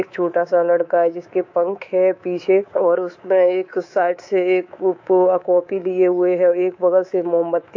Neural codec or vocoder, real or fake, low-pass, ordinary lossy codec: codec, 24 kHz, 3.1 kbps, DualCodec; fake; 7.2 kHz; AAC, 48 kbps